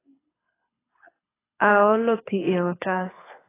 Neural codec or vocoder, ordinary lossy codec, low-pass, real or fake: codec, 24 kHz, 6 kbps, HILCodec; AAC, 16 kbps; 3.6 kHz; fake